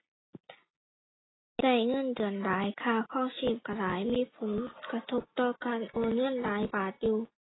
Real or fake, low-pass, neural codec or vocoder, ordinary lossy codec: real; 7.2 kHz; none; AAC, 16 kbps